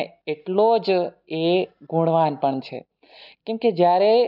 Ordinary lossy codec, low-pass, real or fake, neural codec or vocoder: none; 5.4 kHz; real; none